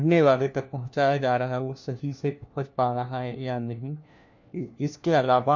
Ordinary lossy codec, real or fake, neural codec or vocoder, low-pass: MP3, 48 kbps; fake; codec, 16 kHz, 1 kbps, FunCodec, trained on LibriTTS, 50 frames a second; 7.2 kHz